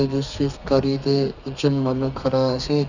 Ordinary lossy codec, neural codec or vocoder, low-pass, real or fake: none; codec, 32 kHz, 1.9 kbps, SNAC; 7.2 kHz; fake